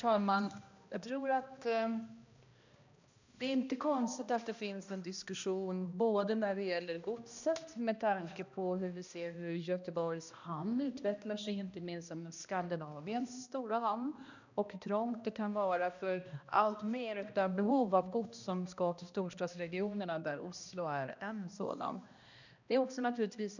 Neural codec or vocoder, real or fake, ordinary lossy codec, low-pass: codec, 16 kHz, 1 kbps, X-Codec, HuBERT features, trained on balanced general audio; fake; none; 7.2 kHz